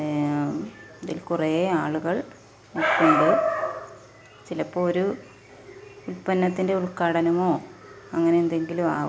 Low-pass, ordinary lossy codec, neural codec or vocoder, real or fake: none; none; none; real